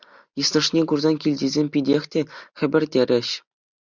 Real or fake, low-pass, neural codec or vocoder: real; 7.2 kHz; none